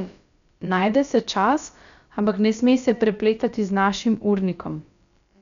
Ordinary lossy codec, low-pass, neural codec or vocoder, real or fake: none; 7.2 kHz; codec, 16 kHz, about 1 kbps, DyCAST, with the encoder's durations; fake